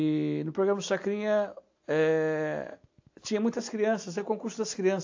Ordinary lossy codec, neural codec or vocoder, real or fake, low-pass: AAC, 48 kbps; none; real; 7.2 kHz